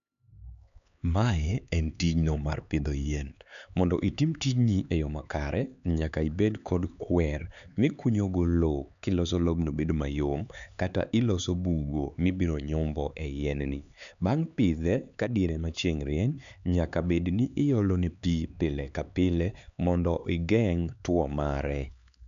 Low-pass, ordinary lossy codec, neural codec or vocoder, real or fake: 7.2 kHz; MP3, 96 kbps; codec, 16 kHz, 4 kbps, X-Codec, HuBERT features, trained on LibriSpeech; fake